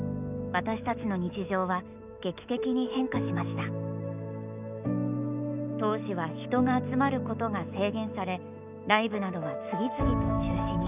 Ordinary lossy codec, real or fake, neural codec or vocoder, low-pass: none; fake; autoencoder, 48 kHz, 128 numbers a frame, DAC-VAE, trained on Japanese speech; 3.6 kHz